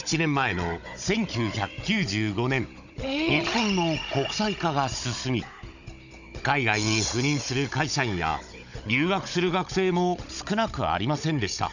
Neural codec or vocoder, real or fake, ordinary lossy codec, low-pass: codec, 16 kHz, 16 kbps, FunCodec, trained on Chinese and English, 50 frames a second; fake; none; 7.2 kHz